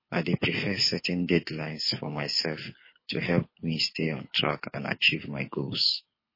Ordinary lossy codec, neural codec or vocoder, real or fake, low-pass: MP3, 24 kbps; codec, 24 kHz, 6 kbps, HILCodec; fake; 5.4 kHz